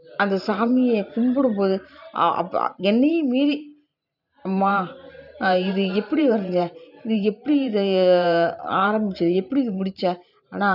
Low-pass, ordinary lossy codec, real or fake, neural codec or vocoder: 5.4 kHz; none; fake; vocoder, 44.1 kHz, 128 mel bands every 512 samples, BigVGAN v2